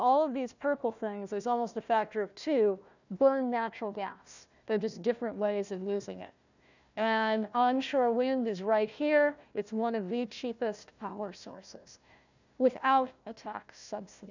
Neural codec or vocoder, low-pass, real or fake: codec, 16 kHz, 1 kbps, FunCodec, trained on Chinese and English, 50 frames a second; 7.2 kHz; fake